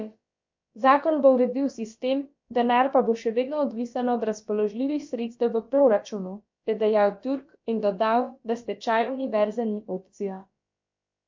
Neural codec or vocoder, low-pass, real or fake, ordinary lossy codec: codec, 16 kHz, about 1 kbps, DyCAST, with the encoder's durations; 7.2 kHz; fake; MP3, 48 kbps